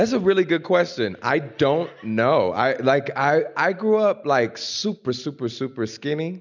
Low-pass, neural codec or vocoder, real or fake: 7.2 kHz; none; real